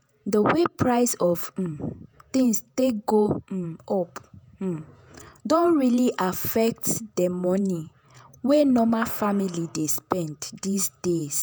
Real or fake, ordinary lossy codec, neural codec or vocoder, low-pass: fake; none; vocoder, 48 kHz, 128 mel bands, Vocos; none